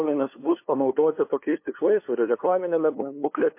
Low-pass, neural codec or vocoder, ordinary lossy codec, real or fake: 3.6 kHz; codec, 16 kHz, 2 kbps, FunCodec, trained on LibriTTS, 25 frames a second; MP3, 24 kbps; fake